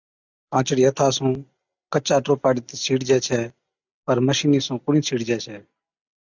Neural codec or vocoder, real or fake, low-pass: none; real; 7.2 kHz